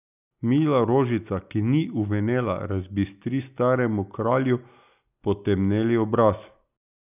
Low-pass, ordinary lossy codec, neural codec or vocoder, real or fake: 3.6 kHz; none; none; real